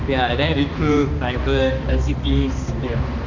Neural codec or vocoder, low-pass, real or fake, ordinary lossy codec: codec, 16 kHz, 2 kbps, X-Codec, HuBERT features, trained on balanced general audio; 7.2 kHz; fake; none